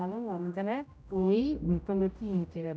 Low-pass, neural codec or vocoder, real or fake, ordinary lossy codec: none; codec, 16 kHz, 0.5 kbps, X-Codec, HuBERT features, trained on general audio; fake; none